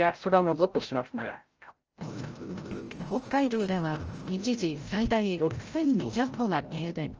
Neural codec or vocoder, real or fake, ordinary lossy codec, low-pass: codec, 16 kHz, 0.5 kbps, FreqCodec, larger model; fake; Opus, 24 kbps; 7.2 kHz